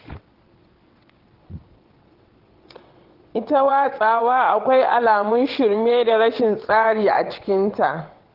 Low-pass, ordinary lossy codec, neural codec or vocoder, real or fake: 5.4 kHz; Opus, 16 kbps; vocoder, 22.05 kHz, 80 mel bands, WaveNeXt; fake